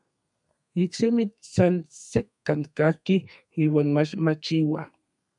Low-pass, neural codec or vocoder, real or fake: 10.8 kHz; codec, 32 kHz, 1.9 kbps, SNAC; fake